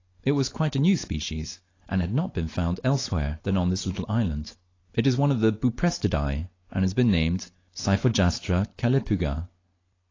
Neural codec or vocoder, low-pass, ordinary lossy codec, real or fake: none; 7.2 kHz; AAC, 32 kbps; real